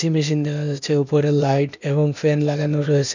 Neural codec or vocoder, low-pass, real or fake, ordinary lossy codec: codec, 16 kHz, 0.8 kbps, ZipCodec; 7.2 kHz; fake; none